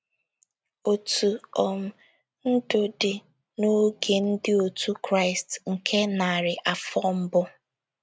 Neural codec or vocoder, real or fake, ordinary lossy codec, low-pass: none; real; none; none